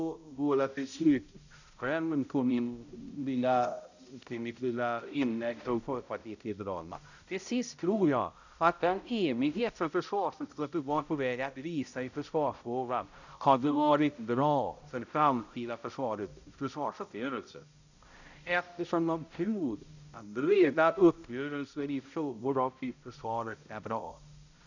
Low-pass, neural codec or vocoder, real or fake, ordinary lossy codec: 7.2 kHz; codec, 16 kHz, 0.5 kbps, X-Codec, HuBERT features, trained on balanced general audio; fake; none